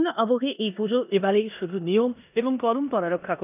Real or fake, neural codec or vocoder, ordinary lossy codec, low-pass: fake; codec, 16 kHz in and 24 kHz out, 0.9 kbps, LongCat-Audio-Codec, four codebook decoder; none; 3.6 kHz